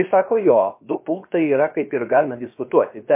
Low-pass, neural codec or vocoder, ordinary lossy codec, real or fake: 3.6 kHz; codec, 16 kHz, about 1 kbps, DyCAST, with the encoder's durations; MP3, 24 kbps; fake